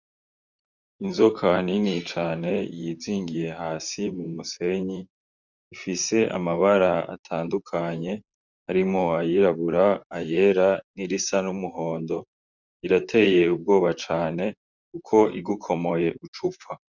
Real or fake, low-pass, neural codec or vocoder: fake; 7.2 kHz; vocoder, 44.1 kHz, 128 mel bands, Pupu-Vocoder